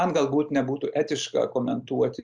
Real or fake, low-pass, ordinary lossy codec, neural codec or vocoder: real; 9.9 kHz; Opus, 64 kbps; none